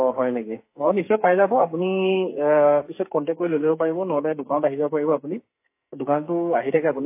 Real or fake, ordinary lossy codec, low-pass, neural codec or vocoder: fake; MP3, 24 kbps; 3.6 kHz; codec, 44.1 kHz, 2.6 kbps, SNAC